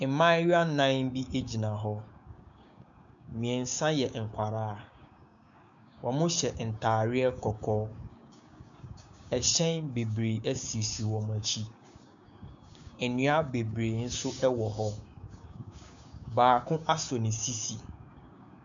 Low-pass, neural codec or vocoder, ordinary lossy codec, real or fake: 7.2 kHz; codec, 16 kHz, 6 kbps, DAC; MP3, 64 kbps; fake